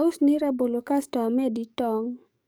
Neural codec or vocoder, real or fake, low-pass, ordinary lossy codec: codec, 44.1 kHz, 7.8 kbps, DAC; fake; none; none